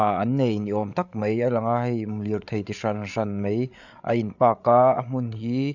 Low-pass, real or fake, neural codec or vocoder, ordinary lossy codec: 7.2 kHz; fake; codec, 16 kHz, 8 kbps, FreqCodec, larger model; none